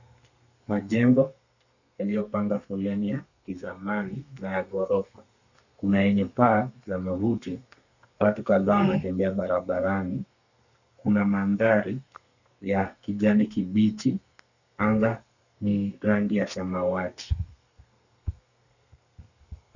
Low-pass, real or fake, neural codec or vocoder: 7.2 kHz; fake; codec, 32 kHz, 1.9 kbps, SNAC